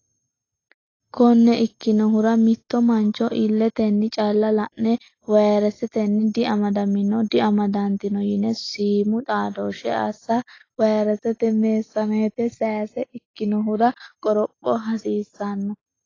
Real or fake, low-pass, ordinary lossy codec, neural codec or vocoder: real; 7.2 kHz; AAC, 32 kbps; none